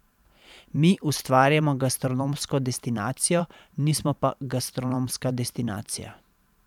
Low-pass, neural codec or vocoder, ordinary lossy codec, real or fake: 19.8 kHz; vocoder, 44.1 kHz, 128 mel bands every 512 samples, BigVGAN v2; none; fake